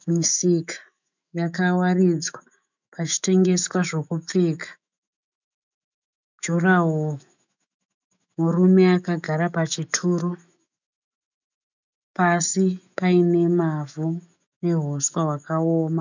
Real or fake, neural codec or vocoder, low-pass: fake; autoencoder, 48 kHz, 128 numbers a frame, DAC-VAE, trained on Japanese speech; 7.2 kHz